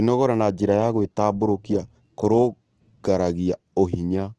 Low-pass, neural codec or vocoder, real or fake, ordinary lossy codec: 10.8 kHz; none; real; Opus, 16 kbps